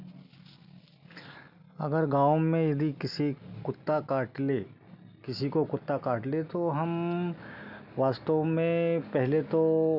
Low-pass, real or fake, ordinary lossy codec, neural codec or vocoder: 5.4 kHz; real; none; none